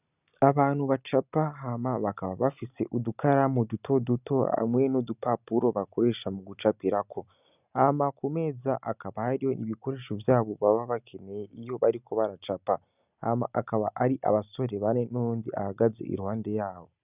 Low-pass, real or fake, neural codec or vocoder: 3.6 kHz; real; none